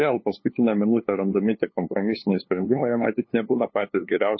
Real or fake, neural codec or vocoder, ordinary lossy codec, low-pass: fake; codec, 16 kHz, 2 kbps, FunCodec, trained on LibriTTS, 25 frames a second; MP3, 24 kbps; 7.2 kHz